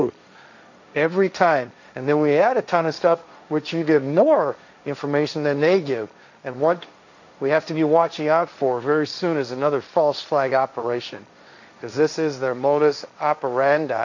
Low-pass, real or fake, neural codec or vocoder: 7.2 kHz; fake; codec, 16 kHz, 1.1 kbps, Voila-Tokenizer